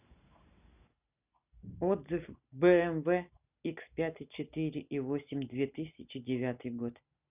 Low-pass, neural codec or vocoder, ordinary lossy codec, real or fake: 3.6 kHz; none; none; real